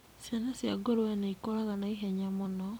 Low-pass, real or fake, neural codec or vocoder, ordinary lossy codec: none; fake; vocoder, 44.1 kHz, 128 mel bands every 256 samples, BigVGAN v2; none